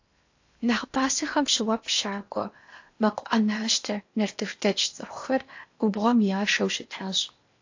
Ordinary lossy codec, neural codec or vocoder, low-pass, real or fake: AAC, 48 kbps; codec, 16 kHz in and 24 kHz out, 0.8 kbps, FocalCodec, streaming, 65536 codes; 7.2 kHz; fake